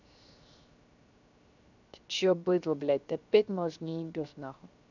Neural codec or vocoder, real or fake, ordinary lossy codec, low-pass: codec, 16 kHz, 0.7 kbps, FocalCodec; fake; none; 7.2 kHz